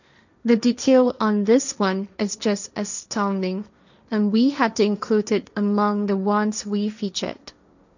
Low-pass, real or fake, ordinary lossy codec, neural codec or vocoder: 7.2 kHz; fake; none; codec, 16 kHz, 1.1 kbps, Voila-Tokenizer